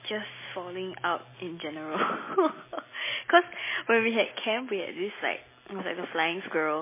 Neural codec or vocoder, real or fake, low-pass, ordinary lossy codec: none; real; 3.6 kHz; MP3, 16 kbps